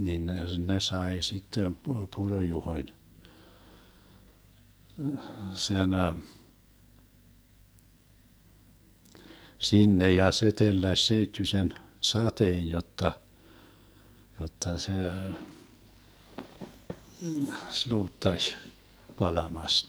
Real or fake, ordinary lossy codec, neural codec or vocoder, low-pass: fake; none; codec, 44.1 kHz, 2.6 kbps, SNAC; none